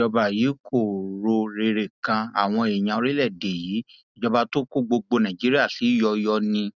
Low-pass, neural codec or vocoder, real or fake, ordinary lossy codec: 7.2 kHz; none; real; none